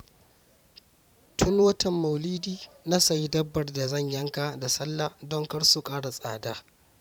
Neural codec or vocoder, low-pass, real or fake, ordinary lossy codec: vocoder, 44.1 kHz, 128 mel bands every 512 samples, BigVGAN v2; 19.8 kHz; fake; none